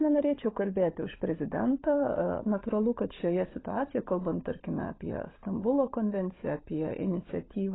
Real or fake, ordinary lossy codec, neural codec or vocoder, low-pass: fake; AAC, 16 kbps; codec, 16 kHz, 16 kbps, FunCodec, trained on LibriTTS, 50 frames a second; 7.2 kHz